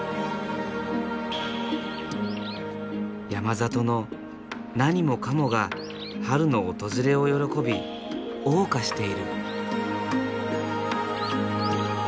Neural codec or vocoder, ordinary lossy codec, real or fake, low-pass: none; none; real; none